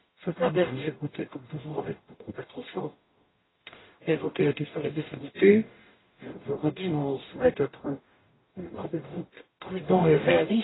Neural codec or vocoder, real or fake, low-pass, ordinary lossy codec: codec, 44.1 kHz, 0.9 kbps, DAC; fake; 7.2 kHz; AAC, 16 kbps